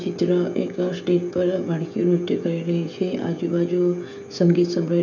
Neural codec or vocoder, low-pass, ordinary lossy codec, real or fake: codec, 16 kHz, 16 kbps, FreqCodec, smaller model; 7.2 kHz; MP3, 64 kbps; fake